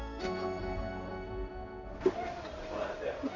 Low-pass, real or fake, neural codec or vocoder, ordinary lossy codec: 7.2 kHz; fake; codec, 16 kHz in and 24 kHz out, 1 kbps, XY-Tokenizer; none